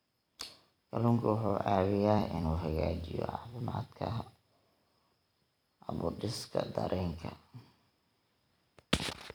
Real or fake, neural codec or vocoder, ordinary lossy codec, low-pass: fake; vocoder, 44.1 kHz, 128 mel bands every 256 samples, BigVGAN v2; none; none